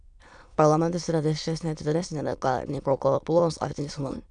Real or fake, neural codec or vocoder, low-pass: fake; autoencoder, 22.05 kHz, a latent of 192 numbers a frame, VITS, trained on many speakers; 9.9 kHz